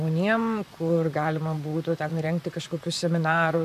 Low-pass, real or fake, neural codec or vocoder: 14.4 kHz; fake; vocoder, 44.1 kHz, 128 mel bands, Pupu-Vocoder